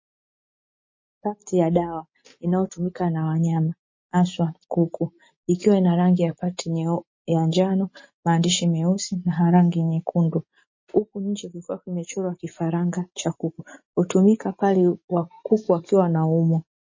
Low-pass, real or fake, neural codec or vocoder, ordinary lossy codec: 7.2 kHz; real; none; MP3, 32 kbps